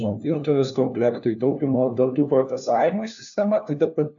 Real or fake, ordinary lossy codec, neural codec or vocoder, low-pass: fake; AAC, 64 kbps; codec, 16 kHz, 1 kbps, FunCodec, trained on LibriTTS, 50 frames a second; 7.2 kHz